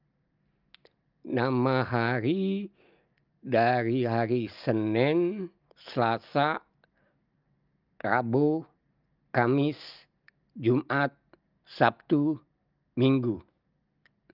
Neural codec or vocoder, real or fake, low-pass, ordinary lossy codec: none; real; 5.4 kHz; Opus, 24 kbps